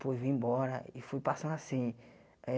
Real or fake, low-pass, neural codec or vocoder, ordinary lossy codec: real; none; none; none